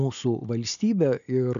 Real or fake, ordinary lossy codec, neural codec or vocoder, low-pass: real; AAC, 96 kbps; none; 7.2 kHz